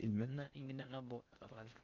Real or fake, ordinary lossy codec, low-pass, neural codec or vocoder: fake; none; 7.2 kHz; codec, 16 kHz in and 24 kHz out, 0.6 kbps, FocalCodec, streaming, 4096 codes